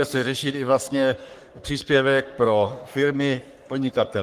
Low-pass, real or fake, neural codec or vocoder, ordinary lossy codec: 14.4 kHz; fake; codec, 44.1 kHz, 3.4 kbps, Pupu-Codec; Opus, 24 kbps